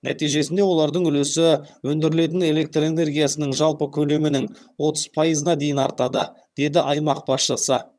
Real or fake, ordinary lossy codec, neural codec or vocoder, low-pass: fake; none; vocoder, 22.05 kHz, 80 mel bands, HiFi-GAN; none